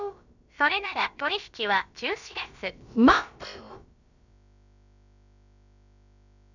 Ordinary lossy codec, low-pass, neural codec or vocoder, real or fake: none; 7.2 kHz; codec, 16 kHz, about 1 kbps, DyCAST, with the encoder's durations; fake